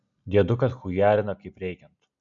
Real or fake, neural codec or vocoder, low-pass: real; none; 7.2 kHz